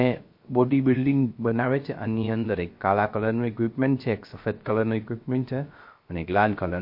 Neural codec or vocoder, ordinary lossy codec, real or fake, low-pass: codec, 16 kHz, 0.3 kbps, FocalCodec; MP3, 32 kbps; fake; 5.4 kHz